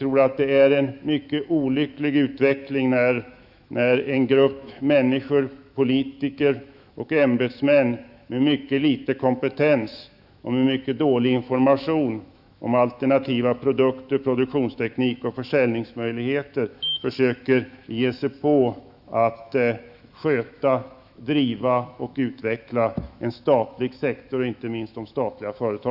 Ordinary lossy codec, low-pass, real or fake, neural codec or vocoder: none; 5.4 kHz; fake; autoencoder, 48 kHz, 128 numbers a frame, DAC-VAE, trained on Japanese speech